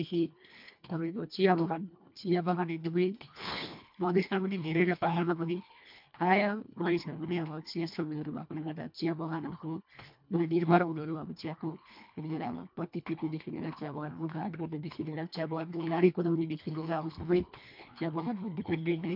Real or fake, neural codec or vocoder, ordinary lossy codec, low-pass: fake; codec, 24 kHz, 1.5 kbps, HILCodec; MP3, 48 kbps; 5.4 kHz